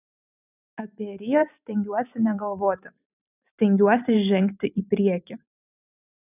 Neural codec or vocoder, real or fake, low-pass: vocoder, 44.1 kHz, 128 mel bands every 256 samples, BigVGAN v2; fake; 3.6 kHz